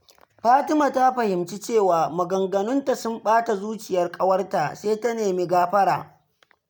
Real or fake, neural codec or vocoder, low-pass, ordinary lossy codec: real; none; none; none